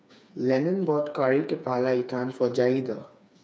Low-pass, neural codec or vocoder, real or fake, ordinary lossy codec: none; codec, 16 kHz, 4 kbps, FreqCodec, smaller model; fake; none